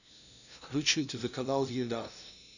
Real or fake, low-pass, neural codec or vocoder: fake; 7.2 kHz; codec, 16 kHz, 0.5 kbps, FunCodec, trained on LibriTTS, 25 frames a second